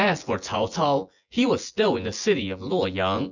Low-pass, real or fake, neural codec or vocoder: 7.2 kHz; fake; vocoder, 24 kHz, 100 mel bands, Vocos